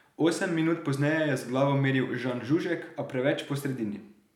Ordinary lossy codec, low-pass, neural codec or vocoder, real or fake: none; 19.8 kHz; none; real